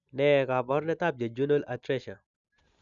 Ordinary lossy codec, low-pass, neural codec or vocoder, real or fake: none; 7.2 kHz; none; real